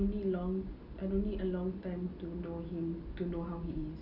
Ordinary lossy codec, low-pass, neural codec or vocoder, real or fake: none; 5.4 kHz; none; real